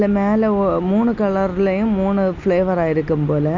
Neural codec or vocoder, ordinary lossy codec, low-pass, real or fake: none; none; 7.2 kHz; real